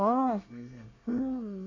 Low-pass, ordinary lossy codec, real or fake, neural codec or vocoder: 7.2 kHz; none; fake; codec, 24 kHz, 1 kbps, SNAC